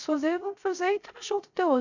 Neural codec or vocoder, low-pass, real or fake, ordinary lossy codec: codec, 16 kHz, 0.3 kbps, FocalCodec; 7.2 kHz; fake; none